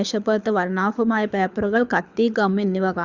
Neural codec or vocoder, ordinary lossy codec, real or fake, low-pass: codec, 24 kHz, 6 kbps, HILCodec; Opus, 64 kbps; fake; 7.2 kHz